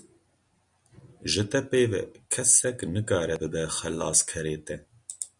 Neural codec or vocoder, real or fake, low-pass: none; real; 10.8 kHz